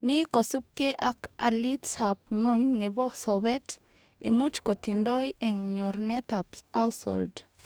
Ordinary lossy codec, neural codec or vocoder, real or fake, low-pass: none; codec, 44.1 kHz, 2.6 kbps, DAC; fake; none